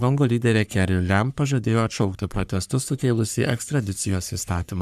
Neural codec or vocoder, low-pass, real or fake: codec, 44.1 kHz, 3.4 kbps, Pupu-Codec; 14.4 kHz; fake